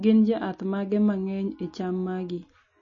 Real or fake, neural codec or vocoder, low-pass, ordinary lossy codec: real; none; 7.2 kHz; MP3, 32 kbps